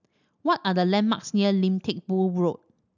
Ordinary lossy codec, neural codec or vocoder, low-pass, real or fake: none; none; 7.2 kHz; real